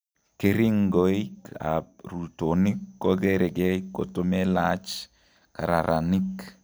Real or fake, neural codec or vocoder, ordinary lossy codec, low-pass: real; none; none; none